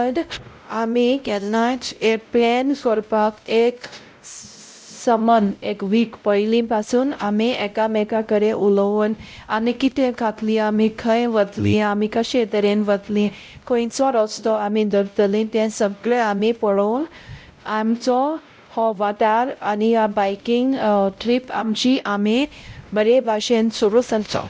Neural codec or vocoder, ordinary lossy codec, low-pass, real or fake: codec, 16 kHz, 0.5 kbps, X-Codec, WavLM features, trained on Multilingual LibriSpeech; none; none; fake